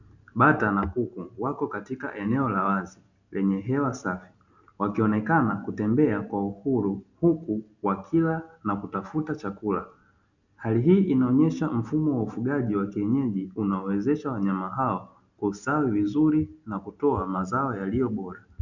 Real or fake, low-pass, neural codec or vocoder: real; 7.2 kHz; none